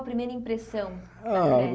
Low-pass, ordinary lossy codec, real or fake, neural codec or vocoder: none; none; real; none